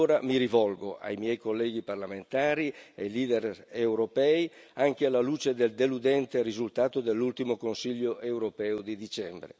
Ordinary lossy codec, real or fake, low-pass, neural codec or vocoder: none; real; none; none